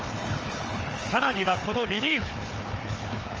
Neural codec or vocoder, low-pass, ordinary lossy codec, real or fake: codec, 16 kHz, 4 kbps, FunCodec, trained on Chinese and English, 50 frames a second; 7.2 kHz; Opus, 24 kbps; fake